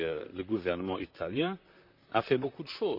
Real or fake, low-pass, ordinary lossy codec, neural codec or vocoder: fake; 5.4 kHz; none; vocoder, 44.1 kHz, 128 mel bands, Pupu-Vocoder